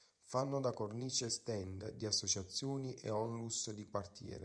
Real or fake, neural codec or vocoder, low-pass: fake; vocoder, 24 kHz, 100 mel bands, Vocos; 9.9 kHz